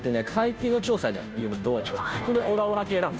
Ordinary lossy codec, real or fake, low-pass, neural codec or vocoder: none; fake; none; codec, 16 kHz, 0.5 kbps, FunCodec, trained on Chinese and English, 25 frames a second